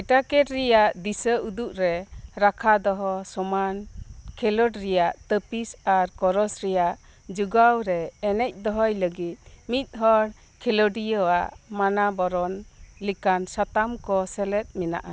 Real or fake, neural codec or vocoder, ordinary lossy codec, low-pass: real; none; none; none